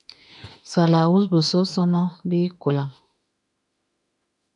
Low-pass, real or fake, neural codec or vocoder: 10.8 kHz; fake; autoencoder, 48 kHz, 32 numbers a frame, DAC-VAE, trained on Japanese speech